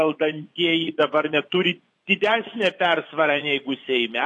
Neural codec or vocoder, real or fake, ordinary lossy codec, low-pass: vocoder, 24 kHz, 100 mel bands, Vocos; fake; AAC, 48 kbps; 10.8 kHz